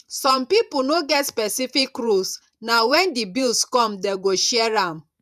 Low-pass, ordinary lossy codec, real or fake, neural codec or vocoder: 14.4 kHz; none; fake; vocoder, 48 kHz, 128 mel bands, Vocos